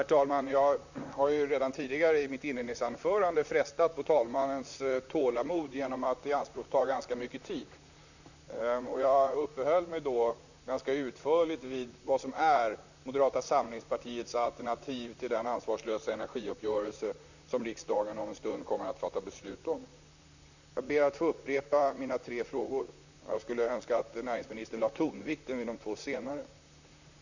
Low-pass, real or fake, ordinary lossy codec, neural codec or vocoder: 7.2 kHz; fake; none; vocoder, 44.1 kHz, 128 mel bands, Pupu-Vocoder